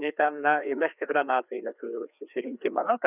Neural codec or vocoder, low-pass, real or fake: codec, 16 kHz, 2 kbps, FreqCodec, larger model; 3.6 kHz; fake